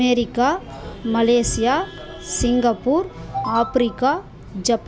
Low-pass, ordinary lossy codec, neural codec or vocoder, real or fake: none; none; none; real